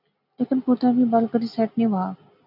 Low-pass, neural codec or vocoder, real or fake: 5.4 kHz; none; real